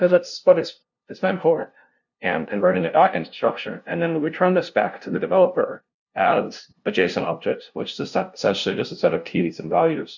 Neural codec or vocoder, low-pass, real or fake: codec, 16 kHz, 0.5 kbps, FunCodec, trained on LibriTTS, 25 frames a second; 7.2 kHz; fake